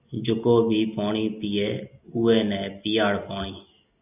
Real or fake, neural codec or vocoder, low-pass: real; none; 3.6 kHz